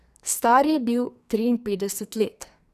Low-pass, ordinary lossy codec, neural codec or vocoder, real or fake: 14.4 kHz; none; codec, 44.1 kHz, 2.6 kbps, SNAC; fake